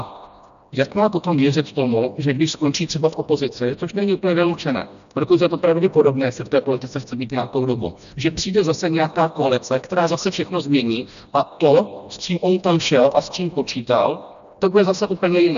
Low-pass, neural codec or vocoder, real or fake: 7.2 kHz; codec, 16 kHz, 1 kbps, FreqCodec, smaller model; fake